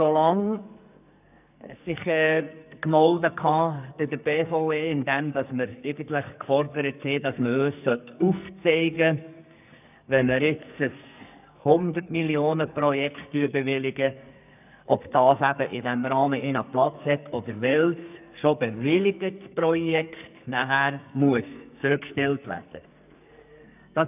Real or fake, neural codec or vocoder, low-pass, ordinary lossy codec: fake; codec, 44.1 kHz, 2.6 kbps, SNAC; 3.6 kHz; none